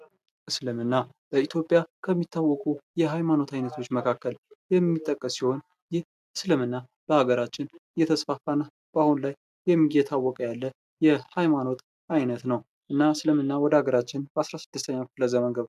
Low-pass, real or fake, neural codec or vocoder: 14.4 kHz; real; none